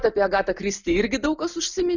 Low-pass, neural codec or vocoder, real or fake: 7.2 kHz; none; real